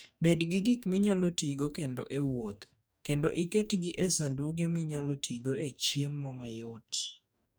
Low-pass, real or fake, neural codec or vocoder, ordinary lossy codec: none; fake; codec, 44.1 kHz, 2.6 kbps, DAC; none